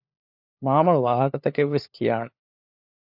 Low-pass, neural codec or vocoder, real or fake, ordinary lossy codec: 5.4 kHz; codec, 16 kHz, 4 kbps, FunCodec, trained on LibriTTS, 50 frames a second; fake; AAC, 48 kbps